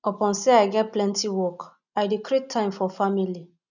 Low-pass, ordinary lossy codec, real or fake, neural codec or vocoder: 7.2 kHz; none; real; none